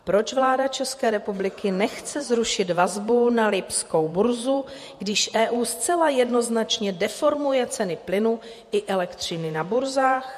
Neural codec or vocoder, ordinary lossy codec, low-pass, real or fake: vocoder, 48 kHz, 128 mel bands, Vocos; MP3, 64 kbps; 14.4 kHz; fake